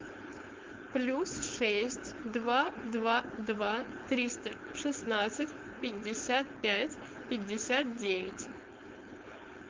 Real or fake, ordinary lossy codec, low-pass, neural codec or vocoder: fake; Opus, 24 kbps; 7.2 kHz; codec, 16 kHz, 4.8 kbps, FACodec